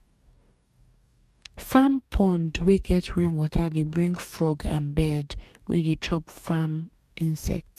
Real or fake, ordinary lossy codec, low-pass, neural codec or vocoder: fake; MP3, 96 kbps; 14.4 kHz; codec, 44.1 kHz, 2.6 kbps, DAC